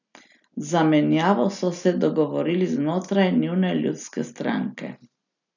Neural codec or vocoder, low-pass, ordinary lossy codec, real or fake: none; 7.2 kHz; none; real